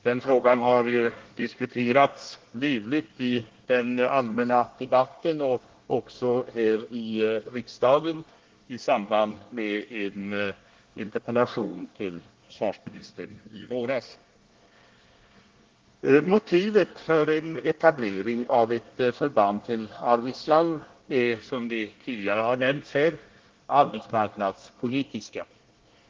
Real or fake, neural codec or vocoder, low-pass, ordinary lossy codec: fake; codec, 24 kHz, 1 kbps, SNAC; 7.2 kHz; Opus, 16 kbps